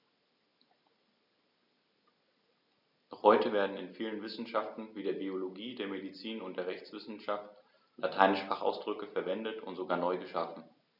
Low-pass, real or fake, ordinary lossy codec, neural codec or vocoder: 5.4 kHz; real; none; none